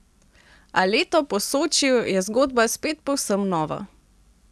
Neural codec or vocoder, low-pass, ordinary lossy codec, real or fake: none; none; none; real